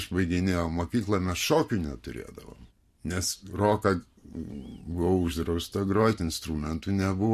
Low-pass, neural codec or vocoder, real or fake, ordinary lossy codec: 14.4 kHz; vocoder, 44.1 kHz, 128 mel bands every 512 samples, BigVGAN v2; fake; MP3, 64 kbps